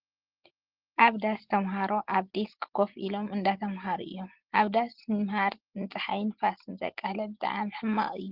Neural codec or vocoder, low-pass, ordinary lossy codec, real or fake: none; 5.4 kHz; Opus, 16 kbps; real